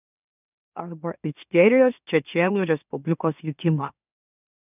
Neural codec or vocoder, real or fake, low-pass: autoencoder, 44.1 kHz, a latent of 192 numbers a frame, MeloTTS; fake; 3.6 kHz